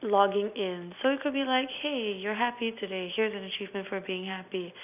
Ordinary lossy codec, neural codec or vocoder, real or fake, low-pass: none; none; real; 3.6 kHz